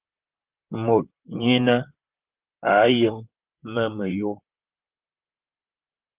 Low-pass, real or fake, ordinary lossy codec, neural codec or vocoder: 3.6 kHz; fake; Opus, 24 kbps; vocoder, 44.1 kHz, 128 mel bands, Pupu-Vocoder